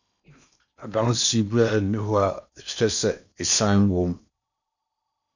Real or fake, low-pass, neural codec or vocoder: fake; 7.2 kHz; codec, 16 kHz in and 24 kHz out, 0.8 kbps, FocalCodec, streaming, 65536 codes